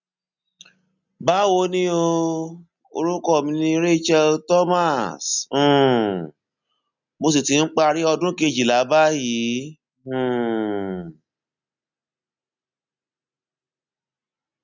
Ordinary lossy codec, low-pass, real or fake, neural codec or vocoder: none; 7.2 kHz; real; none